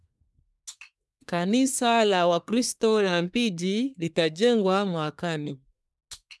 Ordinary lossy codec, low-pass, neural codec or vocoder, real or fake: none; none; codec, 24 kHz, 1 kbps, SNAC; fake